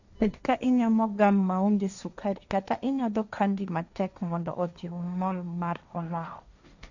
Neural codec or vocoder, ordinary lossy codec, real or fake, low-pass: codec, 16 kHz, 1.1 kbps, Voila-Tokenizer; none; fake; 7.2 kHz